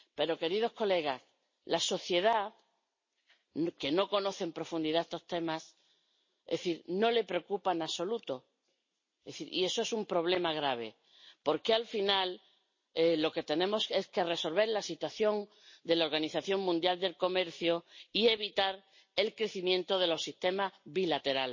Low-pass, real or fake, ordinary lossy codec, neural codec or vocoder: 7.2 kHz; real; MP3, 32 kbps; none